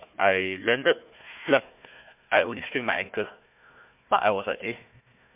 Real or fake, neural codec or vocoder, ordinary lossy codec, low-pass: fake; codec, 16 kHz, 1 kbps, FunCodec, trained on Chinese and English, 50 frames a second; MP3, 32 kbps; 3.6 kHz